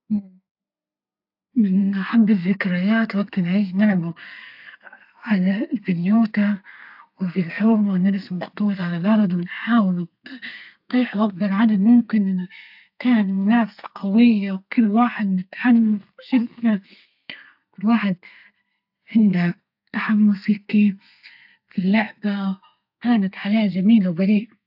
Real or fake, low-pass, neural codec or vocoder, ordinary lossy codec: fake; 5.4 kHz; codec, 32 kHz, 1.9 kbps, SNAC; AAC, 48 kbps